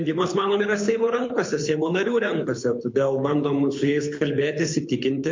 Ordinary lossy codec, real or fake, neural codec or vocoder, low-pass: MP3, 48 kbps; fake; codec, 24 kHz, 6 kbps, HILCodec; 7.2 kHz